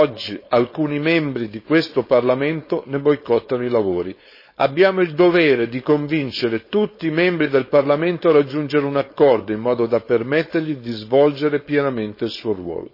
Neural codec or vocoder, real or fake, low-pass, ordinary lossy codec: codec, 16 kHz, 4.8 kbps, FACodec; fake; 5.4 kHz; MP3, 24 kbps